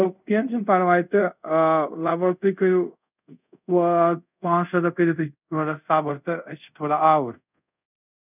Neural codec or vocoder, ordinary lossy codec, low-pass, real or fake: codec, 24 kHz, 0.5 kbps, DualCodec; none; 3.6 kHz; fake